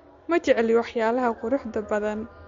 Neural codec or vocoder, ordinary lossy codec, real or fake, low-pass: none; MP3, 48 kbps; real; 7.2 kHz